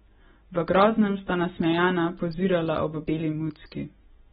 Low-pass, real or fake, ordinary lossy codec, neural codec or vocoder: 19.8 kHz; real; AAC, 16 kbps; none